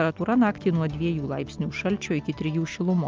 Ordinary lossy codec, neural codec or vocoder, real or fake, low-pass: Opus, 32 kbps; none; real; 7.2 kHz